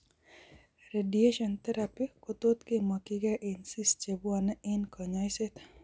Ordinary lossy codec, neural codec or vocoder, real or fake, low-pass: none; none; real; none